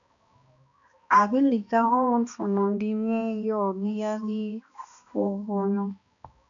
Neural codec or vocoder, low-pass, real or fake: codec, 16 kHz, 1 kbps, X-Codec, HuBERT features, trained on balanced general audio; 7.2 kHz; fake